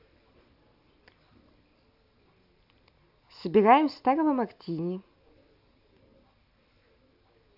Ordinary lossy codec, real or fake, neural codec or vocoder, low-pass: AAC, 48 kbps; real; none; 5.4 kHz